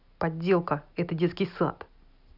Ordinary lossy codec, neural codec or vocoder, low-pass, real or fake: none; none; 5.4 kHz; real